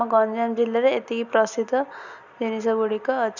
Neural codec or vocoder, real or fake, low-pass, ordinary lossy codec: none; real; 7.2 kHz; none